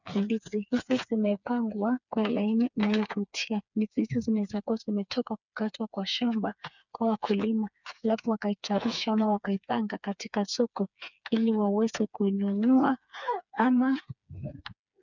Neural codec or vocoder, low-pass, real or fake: codec, 16 kHz, 4 kbps, FreqCodec, smaller model; 7.2 kHz; fake